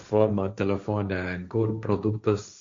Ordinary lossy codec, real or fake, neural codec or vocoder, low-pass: MP3, 48 kbps; fake; codec, 16 kHz, 1.1 kbps, Voila-Tokenizer; 7.2 kHz